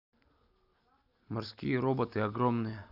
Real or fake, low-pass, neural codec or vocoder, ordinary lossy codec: real; 5.4 kHz; none; none